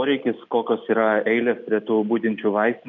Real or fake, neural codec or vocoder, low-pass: real; none; 7.2 kHz